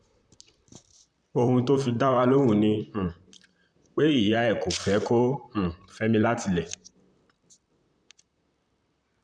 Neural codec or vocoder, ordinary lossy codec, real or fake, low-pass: vocoder, 44.1 kHz, 128 mel bands, Pupu-Vocoder; none; fake; 9.9 kHz